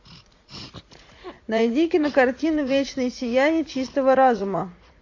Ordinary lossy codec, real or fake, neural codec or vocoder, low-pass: AAC, 48 kbps; fake; vocoder, 44.1 kHz, 80 mel bands, Vocos; 7.2 kHz